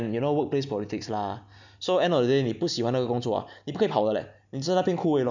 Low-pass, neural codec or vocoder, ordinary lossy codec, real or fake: 7.2 kHz; none; none; real